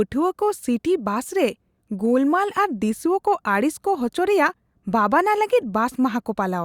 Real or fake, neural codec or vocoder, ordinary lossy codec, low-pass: real; none; Opus, 64 kbps; 19.8 kHz